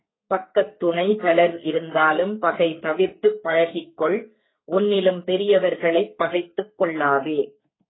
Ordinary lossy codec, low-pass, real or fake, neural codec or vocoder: AAC, 16 kbps; 7.2 kHz; fake; codec, 44.1 kHz, 2.6 kbps, SNAC